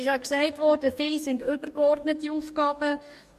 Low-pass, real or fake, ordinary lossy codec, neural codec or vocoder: 14.4 kHz; fake; MP3, 64 kbps; codec, 44.1 kHz, 2.6 kbps, DAC